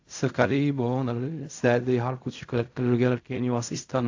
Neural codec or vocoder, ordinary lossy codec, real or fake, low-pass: codec, 16 kHz in and 24 kHz out, 0.4 kbps, LongCat-Audio-Codec, fine tuned four codebook decoder; AAC, 48 kbps; fake; 7.2 kHz